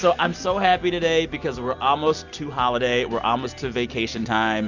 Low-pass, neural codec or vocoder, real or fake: 7.2 kHz; none; real